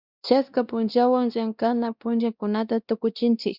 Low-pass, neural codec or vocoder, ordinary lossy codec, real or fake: 5.4 kHz; codec, 16 kHz, 1 kbps, X-Codec, WavLM features, trained on Multilingual LibriSpeech; Opus, 64 kbps; fake